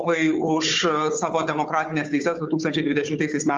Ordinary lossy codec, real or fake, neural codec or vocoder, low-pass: Opus, 32 kbps; fake; codec, 16 kHz, 4 kbps, FunCodec, trained on Chinese and English, 50 frames a second; 7.2 kHz